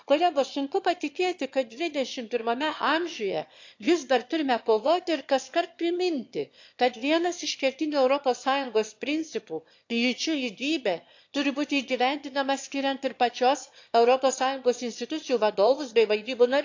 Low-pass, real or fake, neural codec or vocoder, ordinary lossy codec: 7.2 kHz; fake; autoencoder, 22.05 kHz, a latent of 192 numbers a frame, VITS, trained on one speaker; AAC, 48 kbps